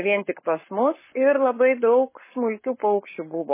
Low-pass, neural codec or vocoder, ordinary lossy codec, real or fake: 3.6 kHz; codec, 44.1 kHz, 7.8 kbps, Pupu-Codec; MP3, 16 kbps; fake